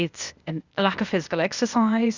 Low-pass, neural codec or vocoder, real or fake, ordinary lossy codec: 7.2 kHz; codec, 16 kHz, 0.8 kbps, ZipCodec; fake; Opus, 64 kbps